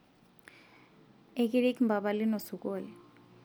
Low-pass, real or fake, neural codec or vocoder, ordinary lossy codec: none; real; none; none